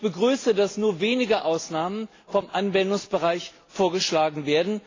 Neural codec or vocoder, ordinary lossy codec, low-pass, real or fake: none; AAC, 32 kbps; 7.2 kHz; real